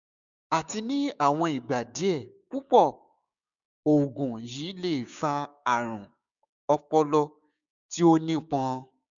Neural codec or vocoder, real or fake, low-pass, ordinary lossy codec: codec, 16 kHz, 6 kbps, DAC; fake; 7.2 kHz; none